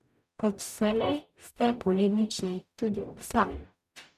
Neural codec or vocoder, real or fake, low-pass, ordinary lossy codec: codec, 44.1 kHz, 0.9 kbps, DAC; fake; 14.4 kHz; none